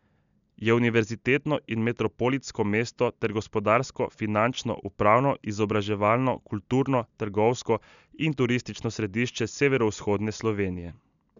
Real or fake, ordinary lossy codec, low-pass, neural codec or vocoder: real; none; 7.2 kHz; none